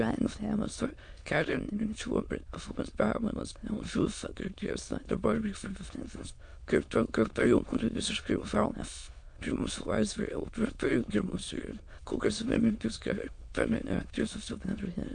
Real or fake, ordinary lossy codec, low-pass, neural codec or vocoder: fake; MP3, 64 kbps; 9.9 kHz; autoencoder, 22.05 kHz, a latent of 192 numbers a frame, VITS, trained on many speakers